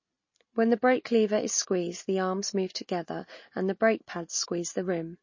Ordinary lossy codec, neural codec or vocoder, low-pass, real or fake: MP3, 32 kbps; none; 7.2 kHz; real